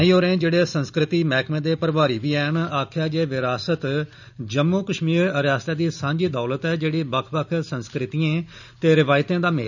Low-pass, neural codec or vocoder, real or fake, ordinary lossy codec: 7.2 kHz; none; real; none